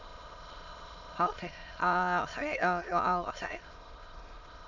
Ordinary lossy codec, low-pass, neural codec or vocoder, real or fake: none; 7.2 kHz; autoencoder, 22.05 kHz, a latent of 192 numbers a frame, VITS, trained on many speakers; fake